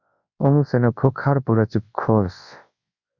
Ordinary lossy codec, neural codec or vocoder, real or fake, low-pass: Opus, 64 kbps; codec, 24 kHz, 0.9 kbps, WavTokenizer, large speech release; fake; 7.2 kHz